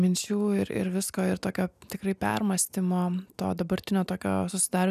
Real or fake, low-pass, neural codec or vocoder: real; 14.4 kHz; none